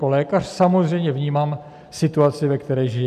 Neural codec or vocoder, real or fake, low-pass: none; real; 14.4 kHz